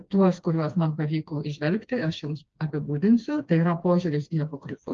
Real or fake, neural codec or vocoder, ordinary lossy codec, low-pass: fake; codec, 16 kHz, 2 kbps, FreqCodec, smaller model; Opus, 24 kbps; 7.2 kHz